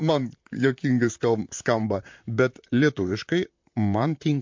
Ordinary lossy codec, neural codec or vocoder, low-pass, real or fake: MP3, 48 kbps; vocoder, 44.1 kHz, 128 mel bands, Pupu-Vocoder; 7.2 kHz; fake